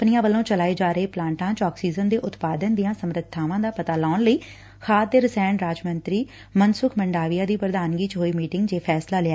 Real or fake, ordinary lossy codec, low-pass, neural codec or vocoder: real; none; none; none